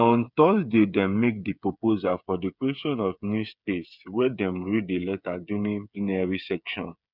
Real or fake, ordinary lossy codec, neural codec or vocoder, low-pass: fake; none; codec, 16 kHz, 8 kbps, FreqCodec, smaller model; 5.4 kHz